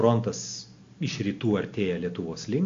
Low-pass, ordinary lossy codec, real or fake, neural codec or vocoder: 7.2 kHz; AAC, 48 kbps; real; none